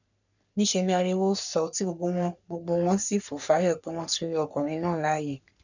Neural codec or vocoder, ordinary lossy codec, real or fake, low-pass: codec, 44.1 kHz, 3.4 kbps, Pupu-Codec; none; fake; 7.2 kHz